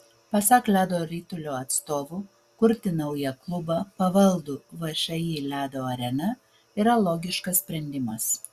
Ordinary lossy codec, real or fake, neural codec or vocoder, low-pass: Opus, 64 kbps; real; none; 14.4 kHz